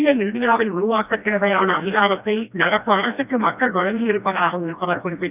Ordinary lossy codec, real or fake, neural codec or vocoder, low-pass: none; fake; codec, 16 kHz, 1 kbps, FreqCodec, smaller model; 3.6 kHz